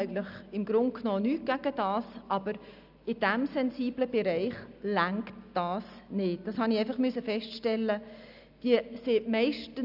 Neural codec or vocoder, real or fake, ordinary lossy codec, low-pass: none; real; none; 5.4 kHz